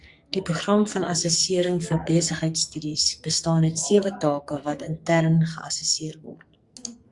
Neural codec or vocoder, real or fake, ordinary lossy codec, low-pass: codec, 44.1 kHz, 2.6 kbps, SNAC; fake; Opus, 64 kbps; 10.8 kHz